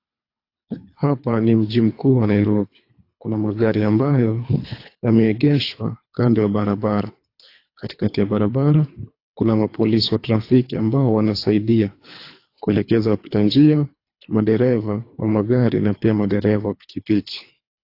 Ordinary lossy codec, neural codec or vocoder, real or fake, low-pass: AAC, 32 kbps; codec, 24 kHz, 3 kbps, HILCodec; fake; 5.4 kHz